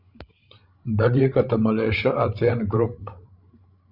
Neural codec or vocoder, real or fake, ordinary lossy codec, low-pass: codec, 16 kHz, 16 kbps, FreqCodec, larger model; fake; Opus, 64 kbps; 5.4 kHz